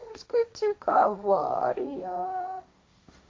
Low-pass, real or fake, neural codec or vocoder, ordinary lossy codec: none; fake; codec, 16 kHz, 1.1 kbps, Voila-Tokenizer; none